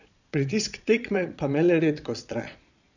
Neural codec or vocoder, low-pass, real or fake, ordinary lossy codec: vocoder, 22.05 kHz, 80 mel bands, Vocos; 7.2 kHz; fake; none